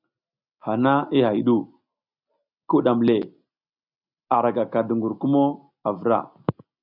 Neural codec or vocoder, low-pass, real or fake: none; 5.4 kHz; real